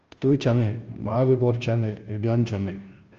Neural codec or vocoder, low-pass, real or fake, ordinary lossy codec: codec, 16 kHz, 0.5 kbps, FunCodec, trained on Chinese and English, 25 frames a second; 7.2 kHz; fake; Opus, 24 kbps